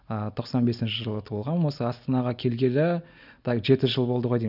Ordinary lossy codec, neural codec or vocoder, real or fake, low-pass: none; none; real; 5.4 kHz